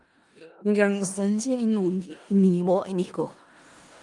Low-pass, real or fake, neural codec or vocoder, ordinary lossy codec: 10.8 kHz; fake; codec, 16 kHz in and 24 kHz out, 0.4 kbps, LongCat-Audio-Codec, four codebook decoder; Opus, 32 kbps